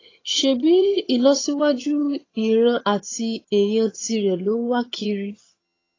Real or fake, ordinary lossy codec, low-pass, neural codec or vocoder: fake; AAC, 32 kbps; 7.2 kHz; vocoder, 22.05 kHz, 80 mel bands, HiFi-GAN